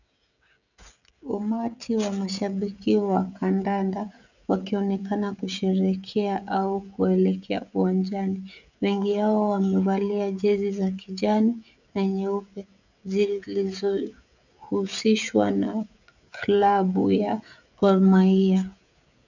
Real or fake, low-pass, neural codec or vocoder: fake; 7.2 kHz; codec, 16 kHz, 16 kbps, FreqCodec, smaller model